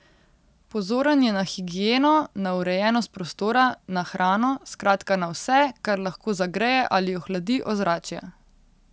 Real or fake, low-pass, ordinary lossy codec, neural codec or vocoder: real; none; none; none